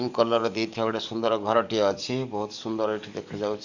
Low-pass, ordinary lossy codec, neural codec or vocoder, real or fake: 7.2 kHz; none; codec, 44.1 kHz, 7.8 kbps, DAC; fake